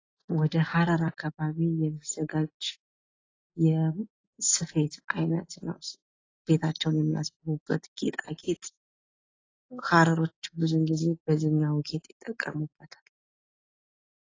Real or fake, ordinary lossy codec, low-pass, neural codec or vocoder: real; AAC, 32 kbps; 7.2 kHz; none